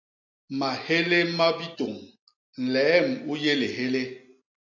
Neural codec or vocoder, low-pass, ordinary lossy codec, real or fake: none; 7.2 kHz; AAC, 48 kbps; real